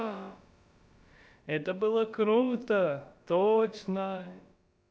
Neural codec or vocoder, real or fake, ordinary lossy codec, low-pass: codec, 16 kHz, about 1 kbps, DyCAST, with the encoder's durations; fake; none; none